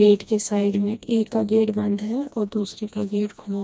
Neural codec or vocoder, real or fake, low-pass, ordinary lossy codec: codec, 16 kHz, 1 kbps, FreqCodec, smaller model; fake; none; none